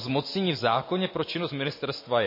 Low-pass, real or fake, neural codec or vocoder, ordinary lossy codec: 5.4 kHz; real; none; MP3, 24 kbps